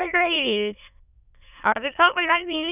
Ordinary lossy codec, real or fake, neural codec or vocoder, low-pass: none; fake; autoencoder, 22.05 kHz, a latent of 192 numbers a frame, VITS, trained on many speakers; 3.6 kHz